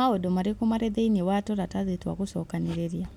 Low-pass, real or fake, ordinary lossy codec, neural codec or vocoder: 19.8 kHz; real; none; none